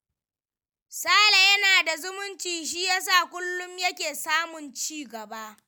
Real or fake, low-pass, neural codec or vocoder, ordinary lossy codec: real; none; none; none